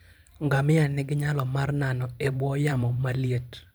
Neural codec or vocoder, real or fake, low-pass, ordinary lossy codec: vocoder, 44.1 kHz, 128 mel bands every 512 samples, BigVGAN v2; fake; none; none